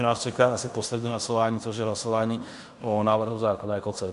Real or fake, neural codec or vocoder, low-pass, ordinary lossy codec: fake; codec, 16 kHz in and 24 kHz out, 0.9 kbps, LongCat-Audio-Codec, fine tuned four codebook decoder; 10.8 kHz; AAC, 96 kbps